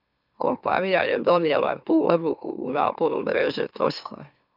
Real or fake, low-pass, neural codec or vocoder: fake; 5.4 kHz; autoencoder, 44.1 kHz, a latent of 192 numbers a frame, MeloTTS